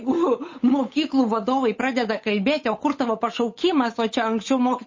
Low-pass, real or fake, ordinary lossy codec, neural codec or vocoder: 7.2 kHz; fake; MP3, 32 kbps; codec, 16 kHz, 8 kbps, FunCodec, trained on Chinese and English, 25 frames a second